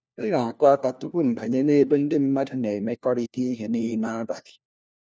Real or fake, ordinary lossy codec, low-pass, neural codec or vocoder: fake; none; none; codec, 16 kHz, 1 kbps, FunCodec, trained on LibriTTS, 50 frames a second